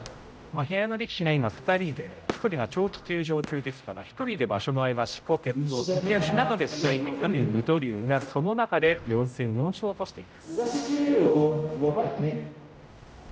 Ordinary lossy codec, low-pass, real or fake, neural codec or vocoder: none; none; fake; codec, 16 kHz, 0.5 kbps, X-Codec, HuBERT features, trained on general audio